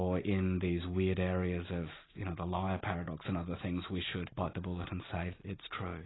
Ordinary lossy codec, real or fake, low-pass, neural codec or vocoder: AAC, 16 kbps; real; 7.2 kHz; none